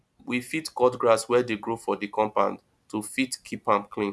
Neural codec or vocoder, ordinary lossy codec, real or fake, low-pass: none; none; real; none